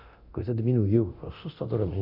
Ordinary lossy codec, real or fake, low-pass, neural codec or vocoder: none; fake; 5.4 kHz; codec, 24 kHz, 0.9 kbps, DualCodec